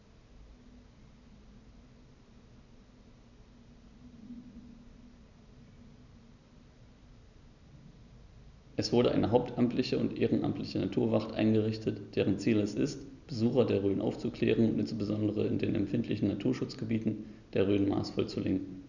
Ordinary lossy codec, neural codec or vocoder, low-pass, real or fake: none; none; 7.2 kHz; real